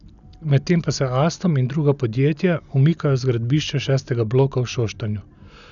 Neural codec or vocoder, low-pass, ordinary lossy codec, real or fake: none; 7.2 kHz; none; real